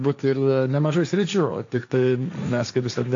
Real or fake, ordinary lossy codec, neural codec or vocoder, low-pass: fake; AAC, 64 kbps; codec, 16 kHz, 1.1 kbps, Voila-Tokenizer; 7.2 kHz